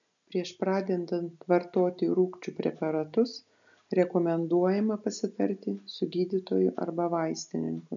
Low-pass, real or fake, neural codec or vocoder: 7.2 kHz; real; none